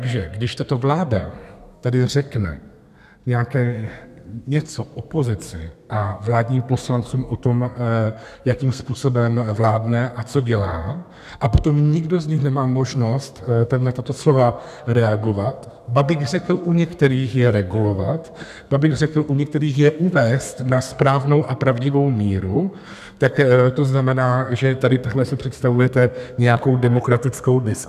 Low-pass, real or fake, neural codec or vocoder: 14.4 kHz; fake; codec, 32 kHz, 1.9 kbps, SNAC